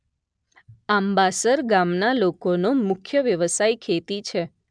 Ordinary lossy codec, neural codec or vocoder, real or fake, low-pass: MP3, 96 kbps; none; real; 9.9 kHz